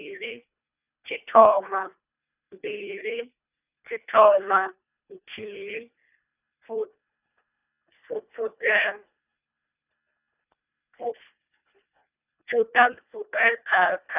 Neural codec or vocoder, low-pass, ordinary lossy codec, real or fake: codec, 24 kHz, 1.5 kbps, HILCodec; 3.6 kHz; none; fake